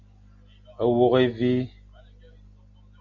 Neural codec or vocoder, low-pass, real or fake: none; 7.2 kHz; real